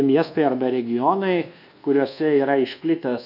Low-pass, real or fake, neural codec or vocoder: 5.4 kHz; fake; codec, 24 kHz, 1.2 kbps, DualCodec